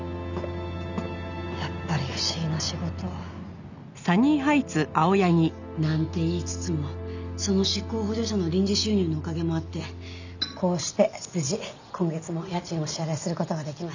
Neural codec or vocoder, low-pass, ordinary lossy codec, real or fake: none; 7.2 kHz; none; real